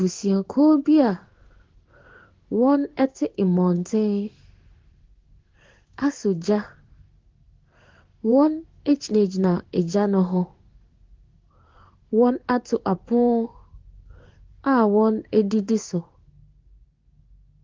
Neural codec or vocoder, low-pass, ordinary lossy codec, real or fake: codec, 16 kHz in and 24 kHz out, 1 kbps, XY-Tokenizer; 7.2 kHz; Opus, 16 kbps; fake